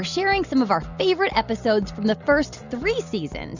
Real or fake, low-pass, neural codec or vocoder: real; 7.2 kHz; none